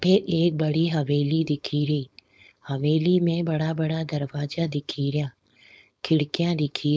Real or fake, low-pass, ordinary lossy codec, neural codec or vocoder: fake; none; none; codec, 16 kHz, 4.8 kbps, FACodec